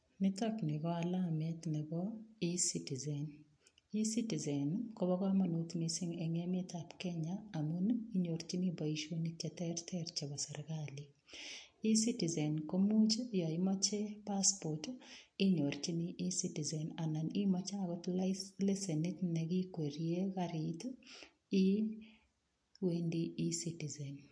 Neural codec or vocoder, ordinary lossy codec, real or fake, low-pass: none; MP3, 48 kbps; real; 9.9 kHz